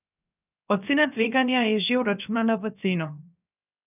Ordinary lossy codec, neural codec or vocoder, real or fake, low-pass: none; codec, 16 kHz, 0.7 kbps, FocalCodec; fake; 3.6 kHz